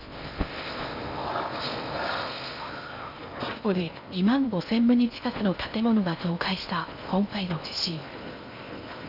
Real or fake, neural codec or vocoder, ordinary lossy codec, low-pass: fake; codec, 16 kHz in and 24 kHz out, 0.6 kbps, FocalCodec, streaming, 4096 codes; none; 5.4 kHz